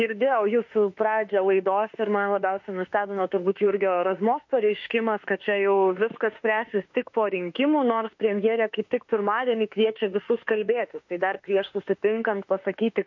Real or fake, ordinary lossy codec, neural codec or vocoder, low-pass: fake; MP3, 48 kbps; autoencoder, 48 kHz, 32 numbers a frame, DAC-VAE, trained on Japanese speech; 7.2 kHz